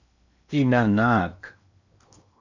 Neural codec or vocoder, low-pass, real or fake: codec, 16 kHz in and 24 kHz out, 0.8 kbps, FocalCodec, streaming, 65536 codes; 7.2 kHz; fake